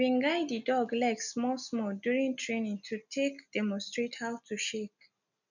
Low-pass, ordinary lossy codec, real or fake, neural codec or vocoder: 7.2 kHz; none; real; none